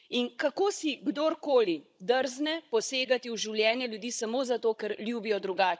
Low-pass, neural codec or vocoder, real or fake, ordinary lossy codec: none; codec, 16 kHz, 16 kbps, FunCodec, trained on Chinese and English, 50 frames a second; fake; none